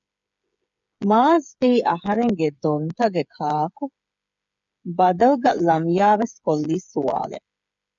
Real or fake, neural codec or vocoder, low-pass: fake; codec, 16 kHz, 8 kbps, FreqCodec, smaller model; 7.2 kHz